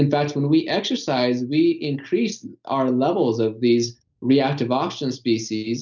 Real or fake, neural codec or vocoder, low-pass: real; none; 7.2 kHz